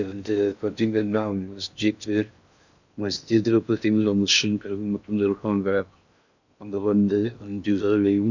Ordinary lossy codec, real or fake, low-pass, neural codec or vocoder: none; fake; 7.2 kHz; codec, 16 kHz in and 24 kHz out, 0.6 kbps, FocalCodec, streaming, 4096 codes